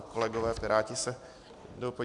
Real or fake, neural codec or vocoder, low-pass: real; none; 10.8 kHz